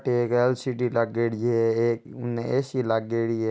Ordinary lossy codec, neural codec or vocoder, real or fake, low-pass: none; none; real; none